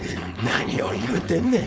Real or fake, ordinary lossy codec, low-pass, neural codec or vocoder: fake; none; none; codec, 16 kHz, 4.8 kbps, FACodec